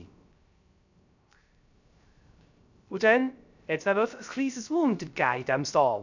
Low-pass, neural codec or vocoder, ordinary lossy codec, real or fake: 7.2 kHz; codec, 16 kHz, 0.3 kbps, FocalCodec; none; fake